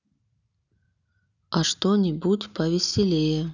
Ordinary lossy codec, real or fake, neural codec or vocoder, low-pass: none; real; none; 7.2 kHz